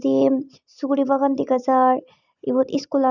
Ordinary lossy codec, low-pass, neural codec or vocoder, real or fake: none; 7.2 kHz; none; real